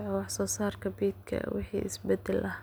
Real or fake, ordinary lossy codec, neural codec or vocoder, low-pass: real; none; none; none